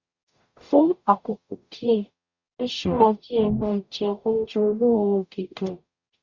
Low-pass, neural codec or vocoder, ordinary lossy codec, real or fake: 7.2 kHz; codec, 44.1 kHz, 0.9 kbps, DAC; Opus, 64 kbps; fake